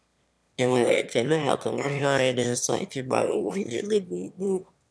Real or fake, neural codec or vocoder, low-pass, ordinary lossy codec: fake; autoencoder, 22.05 kHz, a latent of 192 numbers a frame, VITS, trained on one speaker; none; none